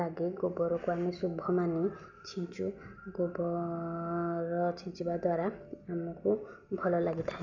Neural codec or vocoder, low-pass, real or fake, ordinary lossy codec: none; 7.2 kHz; real; none